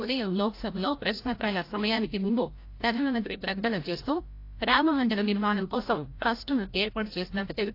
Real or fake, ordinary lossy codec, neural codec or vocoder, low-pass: fake; AAC, 32 kbps; codec, 16 kHz, 0.5 kbps, FreqCodec, larger model; 5.4 kHz